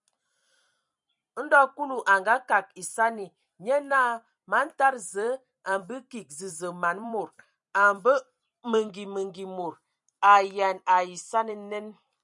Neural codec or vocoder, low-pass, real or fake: vocoder, 44.1 kHz, 128 mel bands every 256 samples, BigVGAN v2; 10.8 kHz; fake